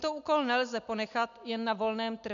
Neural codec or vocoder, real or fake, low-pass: none; real; 7.2 kHz